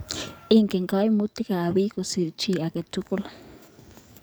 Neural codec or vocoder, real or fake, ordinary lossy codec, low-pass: codec, 44.1 kHz, 7.8 kbps, DAC; fake; none; none